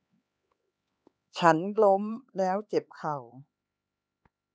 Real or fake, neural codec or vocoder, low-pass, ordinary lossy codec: fake; codec, 16 kHz, 4 kbps, X-Codec, HuBERT features, trained on LibriSpeech; none; none